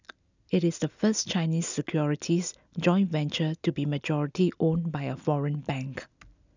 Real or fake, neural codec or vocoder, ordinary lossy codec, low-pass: real; none; none; 7.2 kHz